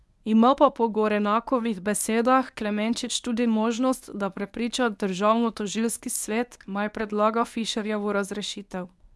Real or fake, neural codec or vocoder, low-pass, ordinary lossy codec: fake; codec, 24 kHz, 0.9 kbps, WavTokenizer, medium speech release version 1; none; none